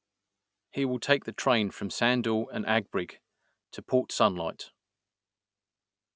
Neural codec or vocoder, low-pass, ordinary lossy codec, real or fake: none; none; none; real